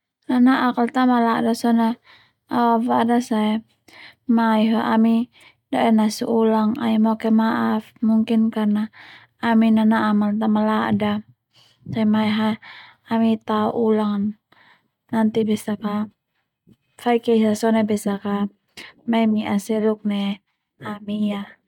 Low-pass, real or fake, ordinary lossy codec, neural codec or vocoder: 19.8 kHz; real; none; none